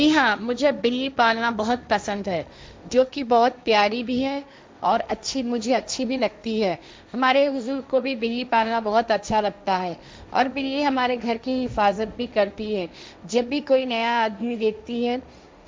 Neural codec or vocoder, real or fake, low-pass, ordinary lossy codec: codec, 16 kHz, 1.1 kbps, Voila-Tokenizer; fake; none; none